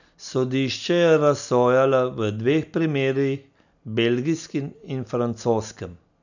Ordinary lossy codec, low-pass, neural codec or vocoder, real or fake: none; 7.2 kHz; none; real